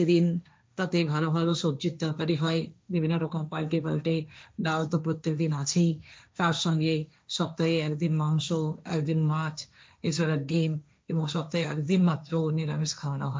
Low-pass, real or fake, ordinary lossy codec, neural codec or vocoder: none; fake; none; codec, 16 kHz, 1.1 kbps, Voila-Tokenizer